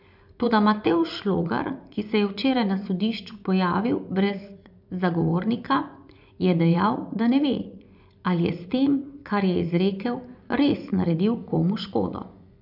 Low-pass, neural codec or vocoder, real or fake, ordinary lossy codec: 5.4 kHz; vocoder, 24 kHz, 100 mel bands, Vocos; fake; none